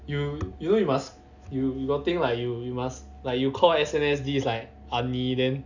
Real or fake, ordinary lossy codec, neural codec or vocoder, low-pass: real; none; none; 7.2 kHz